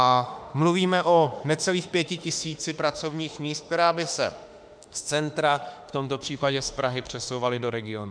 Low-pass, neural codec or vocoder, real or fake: 9.9 kHz; autoencoder, 48 kHz, 32 numbers a frame, DAC-VAE, trained on Japanese speech; fake